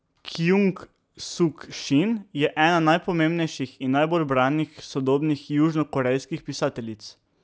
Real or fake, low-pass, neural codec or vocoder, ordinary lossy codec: real; none; none; none